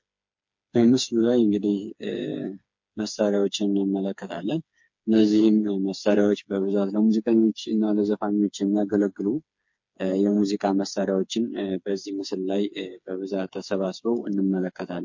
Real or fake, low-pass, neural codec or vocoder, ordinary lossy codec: fake; 7.2 kHz; codec, 16 kHz, 4 kbps, FreqCodec, smaller model; MP3, 48 kbps